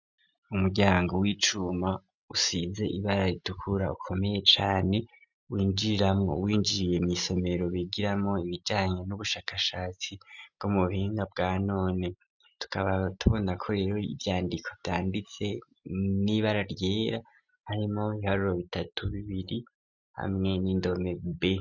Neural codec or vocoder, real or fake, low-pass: none; real; 7.2 kHz